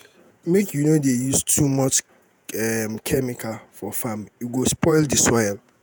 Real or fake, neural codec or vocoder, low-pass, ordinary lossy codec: fake; vocoder, 48 kHz, 128 mel bands, Vocos; none; none